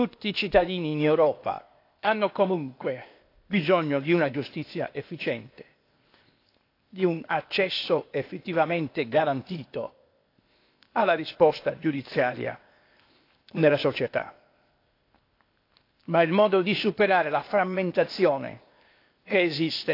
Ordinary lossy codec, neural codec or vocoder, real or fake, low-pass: AAC, 32 kbps; codec, 16 kHz, 0.8 kbps, ZipCodec; fake; 5.4 kHz